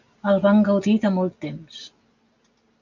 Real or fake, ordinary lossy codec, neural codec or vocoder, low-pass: real; MP3, 64 kbps; none; 7.2 kHz